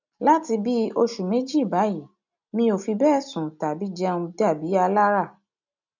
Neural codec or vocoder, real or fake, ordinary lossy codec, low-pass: none; real; none; 7.2 kHz